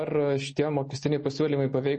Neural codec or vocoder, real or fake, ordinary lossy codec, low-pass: none; real; MP3, 32 kbps; 10.8 kHz